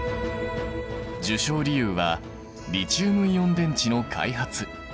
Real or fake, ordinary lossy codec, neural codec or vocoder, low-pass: real; none; none; none